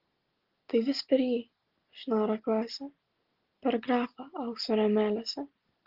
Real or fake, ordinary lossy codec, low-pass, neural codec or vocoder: real; Opus, 16 kbps; 5.4 kHz; none